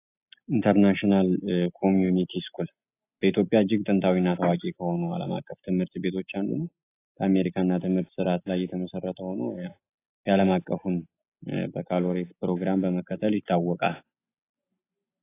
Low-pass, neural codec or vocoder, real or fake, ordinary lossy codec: 3.6 kHz; none; real; AAC, 24 kbps